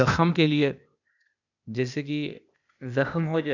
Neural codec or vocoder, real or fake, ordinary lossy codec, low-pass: codec, 16 kHz, 0.8 kbps, ZipCodec; fake; none; 7.2 kHz